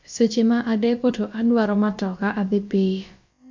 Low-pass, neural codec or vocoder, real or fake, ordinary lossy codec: 7.2 kHz; codec, 16 kHz, about 1 kbps, DyCAST, with the encoder's durations; fake; MP3, 48 kbps